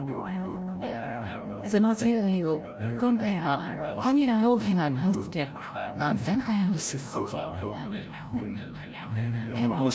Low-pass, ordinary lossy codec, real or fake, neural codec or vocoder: none; none; fake; codec, 16 kHz, 0.5 kbps, FreqCodec, larger model